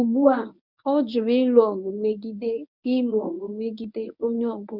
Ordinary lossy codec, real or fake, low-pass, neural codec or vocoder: none; fake; 5.4 kHz; codec, 24 kHz, 0.9 kbps, WavTokenizer, medium speech release version 1